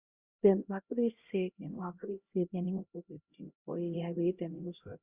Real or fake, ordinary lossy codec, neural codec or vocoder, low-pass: fake; Opus, 64 kbps; codec, 16 kHz, 0.5 kbps, X-Codec, HuBERT features, trained on LibriSpeech; 3.6 kHz